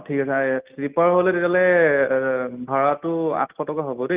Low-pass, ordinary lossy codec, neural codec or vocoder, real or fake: 3.6 kHz; Opus, 24 kbps; none; real